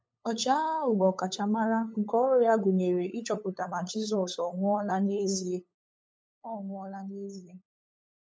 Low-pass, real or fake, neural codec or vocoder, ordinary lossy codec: none; fake; codec, 16 kHz, 8 kbps, FunCodec, trained on LibriTTS, 25 frames a second; none